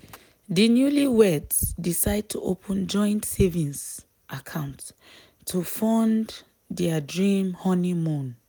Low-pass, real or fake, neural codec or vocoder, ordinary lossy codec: none; real; none; none